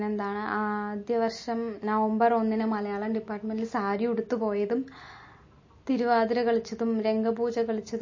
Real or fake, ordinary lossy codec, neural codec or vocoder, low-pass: real; MP3, 32 kbps; none; 7.2 kHz